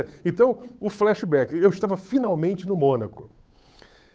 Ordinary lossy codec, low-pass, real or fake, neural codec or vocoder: none; none; fake; codec, 16 kHz, 8 kbps, FunCodec, trained on Chinese and English, 25 frames a second